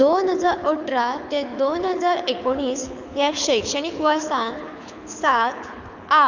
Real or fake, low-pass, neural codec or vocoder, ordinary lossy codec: fake; 7.2 kHz; codec, 24 kHz, 6 kbps, HILCodec; none